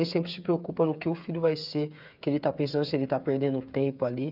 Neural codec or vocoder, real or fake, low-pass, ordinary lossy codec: codec, 16 kHz, 8 kbps, FreqCodec, smaller model; fake; 5.4 kHz; none